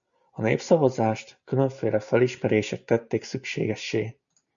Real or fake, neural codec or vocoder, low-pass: real; none; 7.2 kHz